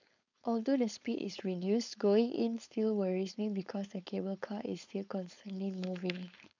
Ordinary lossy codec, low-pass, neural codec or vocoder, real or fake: none; 7.2 kHz; codec, 16 kHz, 4.8 kbps, FACodec; fake